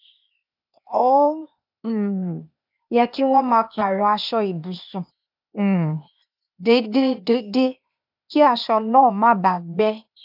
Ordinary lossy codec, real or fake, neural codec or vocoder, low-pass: none; fake; codec, 16 kHz, 0.8 kbps, ZipCodec; 5.4 kHz